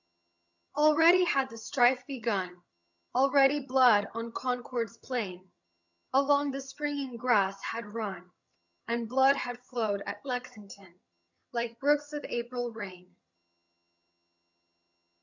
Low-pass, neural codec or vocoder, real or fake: 7.2 kHz; vocoder, 22.05 kHz, 80 mel bands, HiFi-GAN; fake